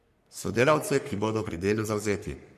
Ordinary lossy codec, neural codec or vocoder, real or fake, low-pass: MP3, 64 kbps; codec, 44.1 kHz, 3.4 kbps, Pupu-Codec; fake; 14.4 kHz